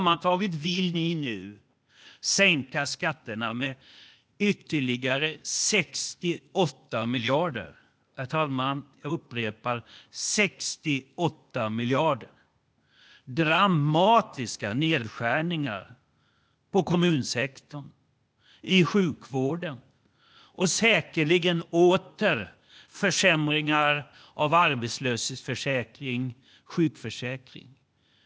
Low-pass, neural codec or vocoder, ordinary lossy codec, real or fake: none; codec, 16 kHz, 0.8 kbps, ZipCodec; none; fake